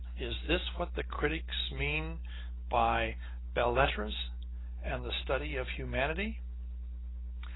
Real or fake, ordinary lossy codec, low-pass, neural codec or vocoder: real; AAC, 16 kbps; 7.2 kHz; none